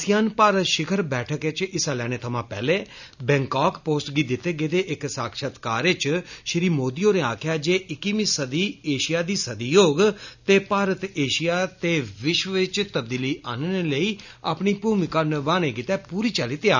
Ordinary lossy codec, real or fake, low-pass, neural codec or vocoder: none; real; 7.2 kHz; none